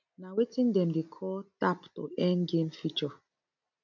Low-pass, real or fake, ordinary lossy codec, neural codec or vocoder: 7.2 kHz; real; none; none